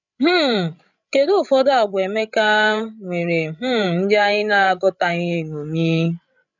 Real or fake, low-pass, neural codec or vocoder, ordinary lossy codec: fake; 7.2 kHz; codec, 16 kHz, 16 kbps, FreqCodec, larger model; none